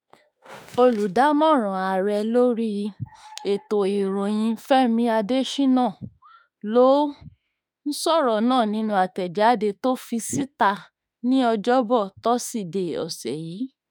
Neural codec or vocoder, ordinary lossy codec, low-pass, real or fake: autoencoder, 48 kHz, 32 numbers a frame, DAC-VAE, trained on Japanese speech; none; none; fake